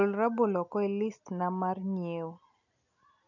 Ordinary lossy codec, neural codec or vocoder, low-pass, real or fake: none; none; 7.2 kHz; real